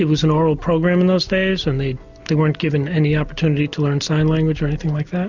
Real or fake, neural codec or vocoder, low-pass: real; none; 7.2 kHz